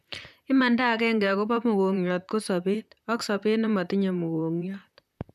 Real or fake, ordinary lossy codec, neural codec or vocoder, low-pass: fake; none; vocoder, 44.1 kHz, 128 mel bands, Pupu-Vocoder; 14.4 kHz